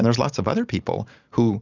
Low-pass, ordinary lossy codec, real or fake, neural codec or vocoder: 7.2 kHz; Opus, 64 kbps; real; none